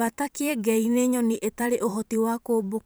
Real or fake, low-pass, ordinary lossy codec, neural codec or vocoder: fake; none; none; vocoder, 44.1 kHz, 128 mel bands every 512 samples, BigVGAN v2